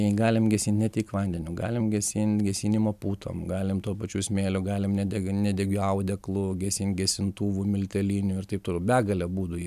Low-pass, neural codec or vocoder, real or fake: 14.4 kHz; none; real